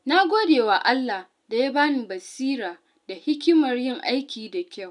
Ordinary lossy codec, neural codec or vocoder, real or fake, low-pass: none; none; real; 10.8 kHz